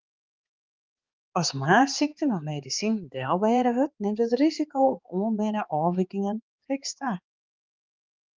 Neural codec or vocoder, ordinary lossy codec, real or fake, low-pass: codec, 16 kHz, 4 kbps, X-Codec, HuBERT features, trained on balanced general audio; Opus, 24 kbps; fake; 7.2 kHz